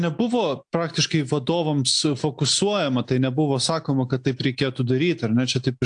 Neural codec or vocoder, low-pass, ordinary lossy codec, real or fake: none; 9.9 kHz; AAC, 64 kbps; real